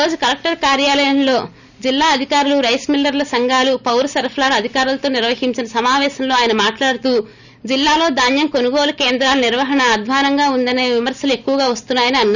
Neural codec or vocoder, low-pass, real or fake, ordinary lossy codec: none; 7.2 kHz; real; none